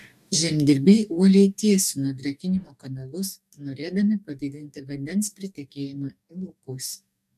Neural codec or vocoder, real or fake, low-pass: codec, 44.1 kHz, 2.6 kbps, DAC; fake; 14.4 kHz